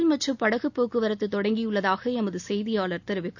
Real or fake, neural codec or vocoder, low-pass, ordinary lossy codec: real; none; 7.2 kHz; none